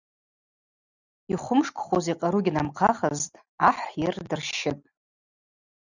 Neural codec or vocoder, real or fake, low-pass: none; real; 7.2 kHz